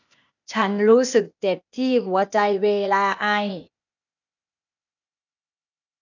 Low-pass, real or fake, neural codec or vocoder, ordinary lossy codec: 7.2 kHz; fake; codec, 16 kHz, 0.8 kbps, ZipCodec; none